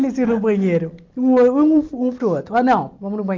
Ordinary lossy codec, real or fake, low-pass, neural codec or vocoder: Opus, 24 kbps; real; 7.2 kHz; none